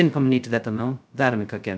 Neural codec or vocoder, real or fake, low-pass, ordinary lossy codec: codec, 16 kHz, 0.2 kbps, FocalCodec; fake; none; none